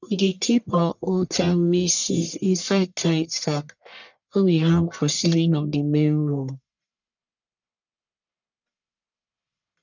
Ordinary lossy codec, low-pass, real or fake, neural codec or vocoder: none; 7.2 kHz; fake; codec, 44.1 kHz, 1.7 kbps, Pupu-Codec